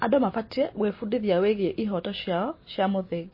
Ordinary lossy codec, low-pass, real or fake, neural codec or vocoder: MP3, 24 kbps; 5.4 kHz; real; none